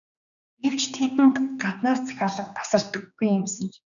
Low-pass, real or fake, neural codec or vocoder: 7.2 kHz; fake; codec, 16 kHz, 2 kbps, X-Codec, HuBERT features, trained on general audio